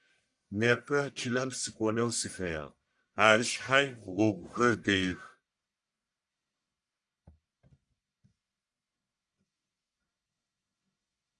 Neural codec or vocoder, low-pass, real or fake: codec, 44.1 kHz, 1.7 kbps, Pupu-Codec; 10.8 kHz; fake